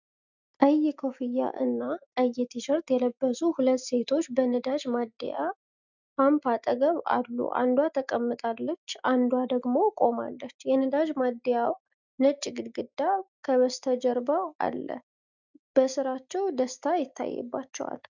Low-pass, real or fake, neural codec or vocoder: 7.2 kHz; real; none